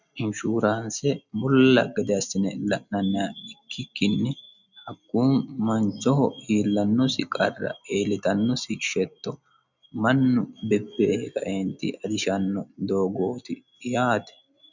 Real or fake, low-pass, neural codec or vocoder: real; 7.2 kHz; none